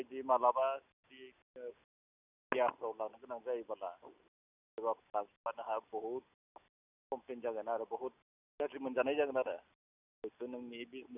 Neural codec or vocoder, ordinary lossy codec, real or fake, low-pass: none; none; real; 3.6 kHz